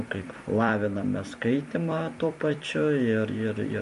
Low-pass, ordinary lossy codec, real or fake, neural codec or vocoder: 14.4 kHz; MP3, 48 kbps; fake; vocoder, 44.1 kHz, 128 mel bands every 256 samples, BigVGAN v2